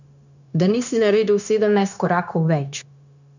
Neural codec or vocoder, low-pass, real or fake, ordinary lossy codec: codec, 16 kHz, 0.9 kbps, LongCat-Audio-Codec; 7.2 kHz; fake; none